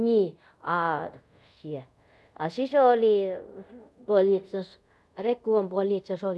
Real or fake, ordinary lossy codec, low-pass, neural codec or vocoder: fake; none; none; codec, 24 kHz, 0.5 kbps, DualCodec